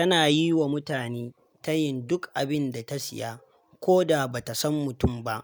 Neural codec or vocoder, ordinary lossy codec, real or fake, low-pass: none; none; real; none